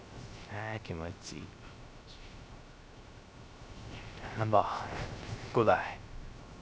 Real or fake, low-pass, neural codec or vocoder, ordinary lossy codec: fake; none; codec, 16 kHz, 0.3 kbps, FocalCodec; none